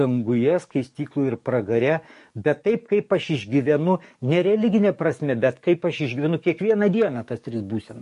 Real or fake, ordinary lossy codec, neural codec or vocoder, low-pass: fake; MP3, 48 kbps; codec, 44.1 kHz, 7.8 kbps, DAC; 14.4 kHz